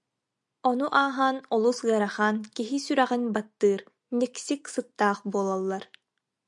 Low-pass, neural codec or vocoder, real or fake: 10.8 kHz; none; real